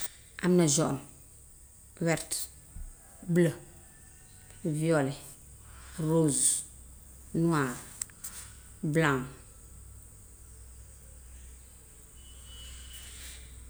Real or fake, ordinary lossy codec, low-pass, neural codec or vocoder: real; none; none; none